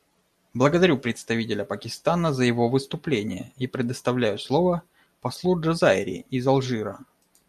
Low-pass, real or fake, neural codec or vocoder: 14.4 kHz; real; none